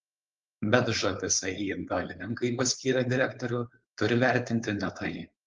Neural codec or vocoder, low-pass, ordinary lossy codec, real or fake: codec, 16 kHz, 4.8 kbps, FACodec; 7.2 kHz; Opus, 24 kbps; fake